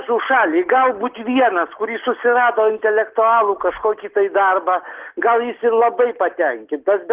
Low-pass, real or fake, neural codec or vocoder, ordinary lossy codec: 3.6 kHz; real; none; Opus, 16 kbps